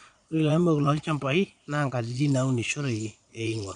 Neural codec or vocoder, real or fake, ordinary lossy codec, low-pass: vocoder, 22.05 kHz, 80 mel bands, Vocos; fake; none; 9.9 kHz